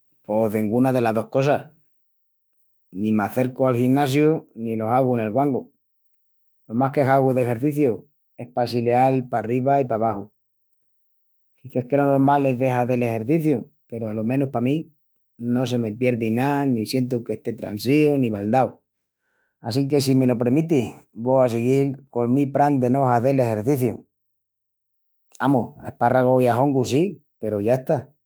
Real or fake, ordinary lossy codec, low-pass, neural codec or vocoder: fake; none; none; autoencoder, 48 kHz, 32 numbers a frame, DAC-VAE, trained on Japanese speech